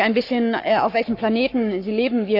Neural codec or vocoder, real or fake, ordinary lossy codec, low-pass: codec, 44.1 kHz, 7.8 kbps, Pupu-Codec; fake; none; 5.4 kHz